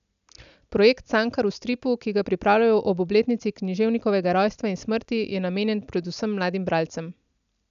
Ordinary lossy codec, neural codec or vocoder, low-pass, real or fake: none; none; 7.2 kHz; real